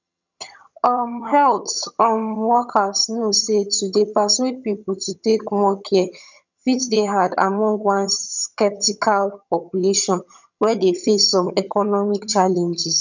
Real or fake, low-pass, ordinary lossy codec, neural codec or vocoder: fake; 7.2 kHz; none; vocoder, 22.05 kHz, 80 mel bands, HiFi-GAN